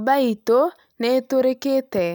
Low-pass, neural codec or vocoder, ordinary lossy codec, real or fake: none; none; none; real